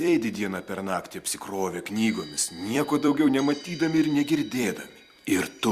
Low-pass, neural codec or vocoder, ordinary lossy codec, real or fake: 14.4 kHz; vocoder, 44.1 kHz, 128 mel bands every 256 samples, BigVGAN v2; Opus, 64 kbps; fake